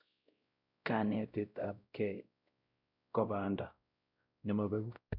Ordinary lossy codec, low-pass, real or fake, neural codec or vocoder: none; 5.4 kHz; fake; codec, 16 kHz, 0.5 kbps, X-Codec, WavLM features, trained on Multilingual LibriSpeech